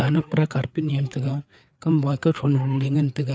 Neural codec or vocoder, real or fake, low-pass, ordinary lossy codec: codec, 16 kHz, 4 kbps, FreqCodec, larger model; fake; none; none